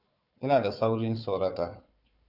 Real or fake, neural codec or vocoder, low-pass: fake; codec, 16 kHz, 4 kbps, FunCodec, trained on Chinese and English, 50 frames a second; 5.4 kHz